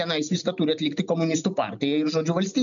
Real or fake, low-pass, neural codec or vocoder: real; 7.2 kHz; none